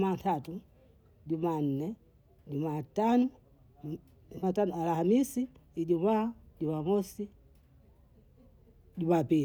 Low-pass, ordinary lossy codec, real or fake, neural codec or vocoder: none; none; real; none